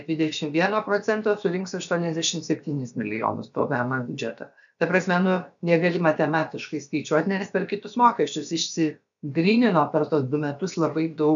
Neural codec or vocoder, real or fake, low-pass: codec, 16 kHz, about 1 kbps, DyCAST, with the encoder's durations; fake; 7.2 kHz